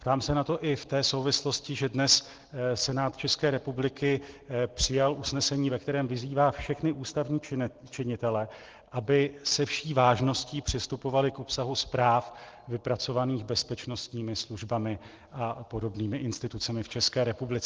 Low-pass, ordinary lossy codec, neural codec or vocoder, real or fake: 7.2 kHz; Opus, 16 kbps; none; real